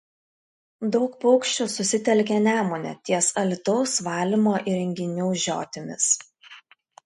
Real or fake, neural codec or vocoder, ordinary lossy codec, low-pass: real; none; MP3, 48 kbps; 10.8 kHz